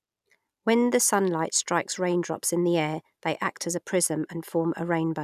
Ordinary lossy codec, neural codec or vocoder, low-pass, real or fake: none; none; 14.4 kHz; real